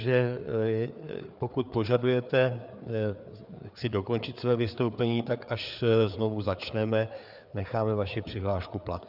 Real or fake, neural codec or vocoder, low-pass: fake; codec, 16 kHz, 4 kbps, FreqCodec, larger model; 5.4 kHz